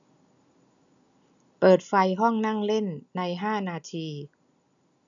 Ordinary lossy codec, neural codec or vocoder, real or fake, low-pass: none; none; real; 7.2 kHz